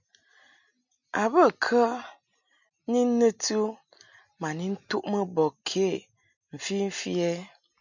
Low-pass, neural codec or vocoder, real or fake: 7.2 kHz; none; real